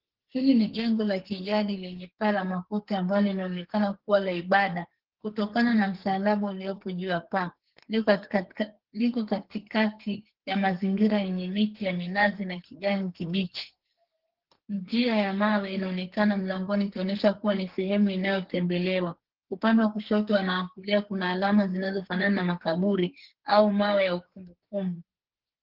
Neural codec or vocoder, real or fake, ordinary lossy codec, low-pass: codec, 44.1 kHz, 2.6 kbps, SNAC; fake; Opus, 16 kbps; 5.4 kHz